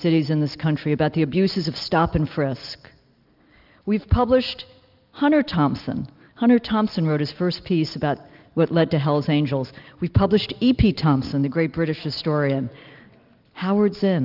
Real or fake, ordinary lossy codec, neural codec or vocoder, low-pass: real; Opus, 24 kbps; none; 5.4 kHz